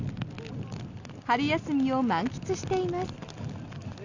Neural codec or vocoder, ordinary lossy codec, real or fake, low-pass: none; none; real; 7.2 kHz